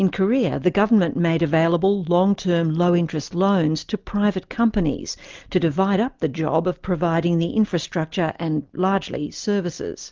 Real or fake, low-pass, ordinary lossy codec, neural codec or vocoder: real; 7.2 kHz; Opus, 32 kbps; none